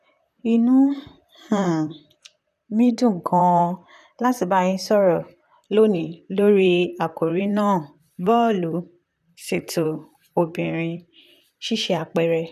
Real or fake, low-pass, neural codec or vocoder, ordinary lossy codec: fake; 14.4 kHz; vocoder, 44.1 kHz, 128 mel bands, Pupu-Vocoder; none